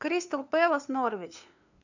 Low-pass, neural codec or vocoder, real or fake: 7.2 kHz; codec, 16 kHz, 2 kbps, FunCodec, trained on LibriTTS, 25 frames a second; fake